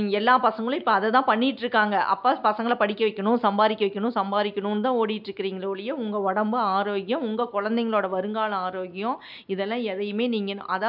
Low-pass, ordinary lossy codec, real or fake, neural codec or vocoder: 5.4 kHz; none; real; none